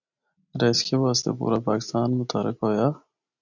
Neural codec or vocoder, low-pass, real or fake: none; 7.2 kHz; real